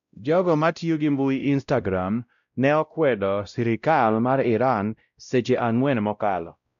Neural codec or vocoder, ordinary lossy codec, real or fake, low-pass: codec, 16 kHz, 0.5 kbps, X-Codec, WavLM features, trained on Multilingual LibriSpeech; none; fake; 7.2 kHz